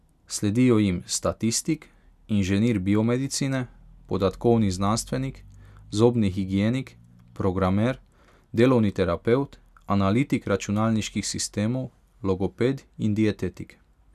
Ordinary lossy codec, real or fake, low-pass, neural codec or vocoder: none; real; 14.4 kHz; none